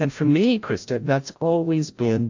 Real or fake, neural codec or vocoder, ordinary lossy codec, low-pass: fake; codec, 16 kHz, 0.5 kbps, FreqCodec, larger model; AAC, 48 kbps; 7.2 kHz